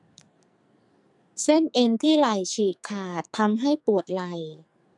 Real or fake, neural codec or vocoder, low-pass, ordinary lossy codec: fake; codec, 44.1 kHz, 2.6 kbps, SNAC; 10.8 kHz; none